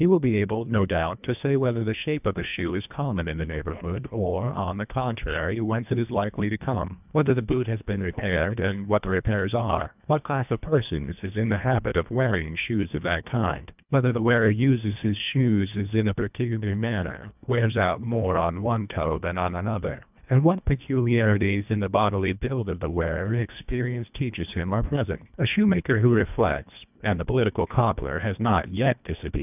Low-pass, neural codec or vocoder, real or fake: 3.6 kHz; codec, 24 kHz, 1.5 kbps, HILCodec; fake